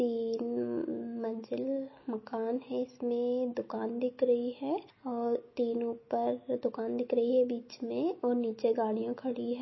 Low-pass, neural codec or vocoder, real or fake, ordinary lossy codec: 7.2 kHz; none; real; MP3, 24 kbps